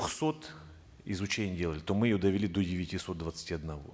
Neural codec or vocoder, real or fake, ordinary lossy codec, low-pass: none; real; none; none